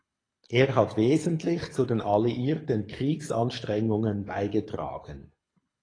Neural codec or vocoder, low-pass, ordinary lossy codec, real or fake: codec, 24 kHz, 6 kbps, HILCodec; 9.9 kHz; AAC, 32 kbps; fake